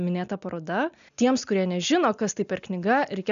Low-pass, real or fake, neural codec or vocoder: 7.2 kHz; real; none